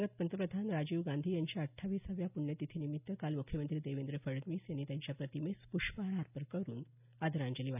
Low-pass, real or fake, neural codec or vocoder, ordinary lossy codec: 3.6 kHz; real; none; none